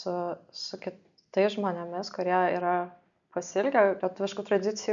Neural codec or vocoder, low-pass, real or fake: none; 7.2 kHz; real